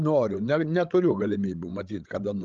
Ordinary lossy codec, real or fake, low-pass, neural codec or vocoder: Opus, 32 kbps; fake; 7.2 kHz; codec, 16 kHz, 16 kbps, FreqCodec, larger model